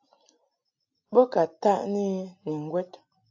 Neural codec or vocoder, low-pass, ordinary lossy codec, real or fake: none; 7.2 kHz; AAC, 48 kbps; real